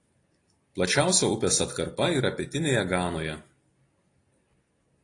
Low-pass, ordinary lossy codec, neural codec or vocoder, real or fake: 10.8 kHz; AAC, 32 kbps; none; real